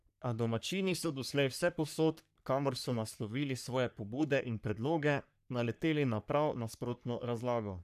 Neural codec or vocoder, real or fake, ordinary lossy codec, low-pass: codec, 44.1 kHz, 3.4 kbps, Pupu-Codec; fake; none; 14.4 kHz